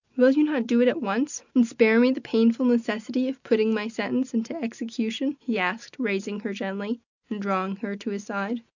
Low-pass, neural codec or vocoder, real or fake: 7.2 kHz; none; real